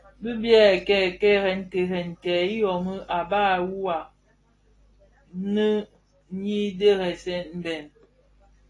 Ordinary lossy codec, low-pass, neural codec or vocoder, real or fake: AAC, 32 kbps; 10.8 kHz; none; real